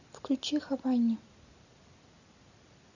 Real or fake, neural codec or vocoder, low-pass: real; none; 7.2 kHz